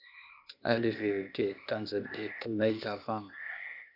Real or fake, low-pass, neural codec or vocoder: fake; 5.4 kHz; codec, 16 kHz, 0.8 kbps, ZipCodec